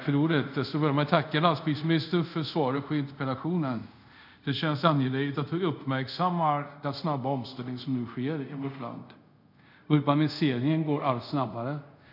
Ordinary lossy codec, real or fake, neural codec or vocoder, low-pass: none; fake; codec, 24 kHz, 0.5 kbps, DualCodec; 5.4 kHz